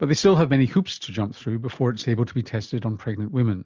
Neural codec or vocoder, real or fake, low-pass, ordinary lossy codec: none; real; 7.2 kHz; Opus, 24 kbps